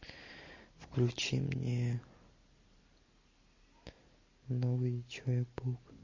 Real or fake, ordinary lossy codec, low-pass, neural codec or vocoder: real; MP3, 32 kbps; 7.2 kHz; none